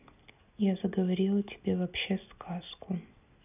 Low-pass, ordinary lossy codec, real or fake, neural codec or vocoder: 3.6 kHz; none; real; none